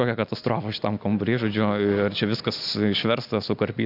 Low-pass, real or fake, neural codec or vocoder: 5.4 kHz; real; none